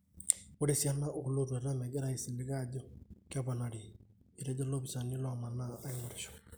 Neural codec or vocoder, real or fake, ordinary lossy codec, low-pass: none; real; none; none